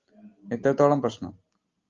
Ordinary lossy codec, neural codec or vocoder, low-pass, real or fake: Opus, 32 kbps; none; 7.2 kHz; real